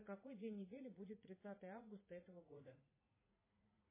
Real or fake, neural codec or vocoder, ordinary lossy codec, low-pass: fake; vocoder, 44.1 kHz, 80 mel bands, Vocos; MP3, 16 kbps; 3.6 kHz